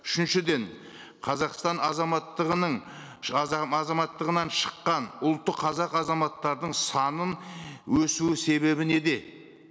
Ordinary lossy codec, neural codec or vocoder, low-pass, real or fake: none; none; none; real